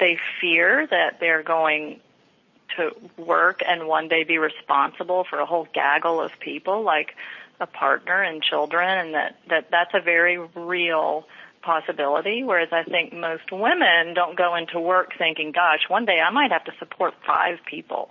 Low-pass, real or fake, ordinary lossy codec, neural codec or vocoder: 7.2 kHz; real; MP3, 32 kbps; none